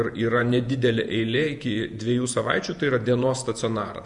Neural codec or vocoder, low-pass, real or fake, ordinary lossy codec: vocoder, 48 kHz, 128 mel bands, Vocos; 10.8 kHz; fake; Opus, 64 kbps